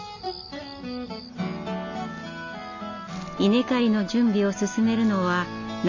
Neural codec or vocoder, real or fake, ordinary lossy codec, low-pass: none; real; none; 7.2 kHz